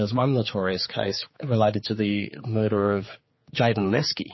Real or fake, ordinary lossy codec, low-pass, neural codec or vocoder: fake; MP3, 24 kbps; 7.2 kHz; codec, 16 kHz, 4 kbps, X-Codec, HuBERT features, trained on general audio